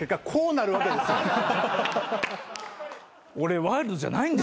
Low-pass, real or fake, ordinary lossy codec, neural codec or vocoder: none; real; none; none